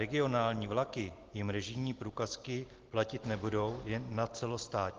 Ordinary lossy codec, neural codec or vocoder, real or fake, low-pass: Opus, 16 kbps; none; real; 7.2 kHz